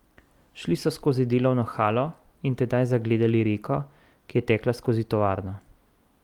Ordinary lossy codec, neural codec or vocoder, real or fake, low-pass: Opus, 32 kbps; none; real; 19.8 kHz